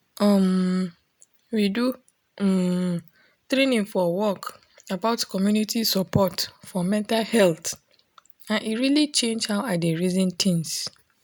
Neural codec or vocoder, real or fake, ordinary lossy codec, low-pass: none; real; none; none